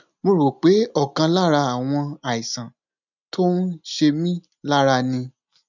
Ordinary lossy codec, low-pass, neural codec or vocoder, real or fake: none; 7.2 kHz; none; real